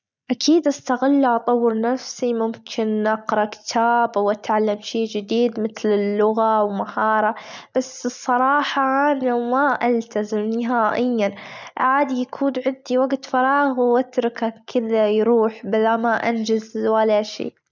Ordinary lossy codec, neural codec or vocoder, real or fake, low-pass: none; none; real; 7.2 kHz